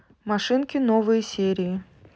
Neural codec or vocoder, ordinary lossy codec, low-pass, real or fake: none; none; none; real